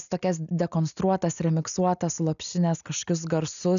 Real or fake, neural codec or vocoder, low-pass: real; none; 7.2 kHz